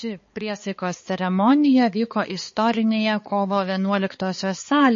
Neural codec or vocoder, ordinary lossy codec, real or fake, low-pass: codec, 16 kHz, 4 kbps, X-Codec, HuBERT features, trained on balanced general audio; MP3, 32 kbps; fake; 7.2 kHz